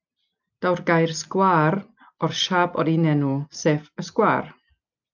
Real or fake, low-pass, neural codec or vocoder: real; 7.2 kHz; none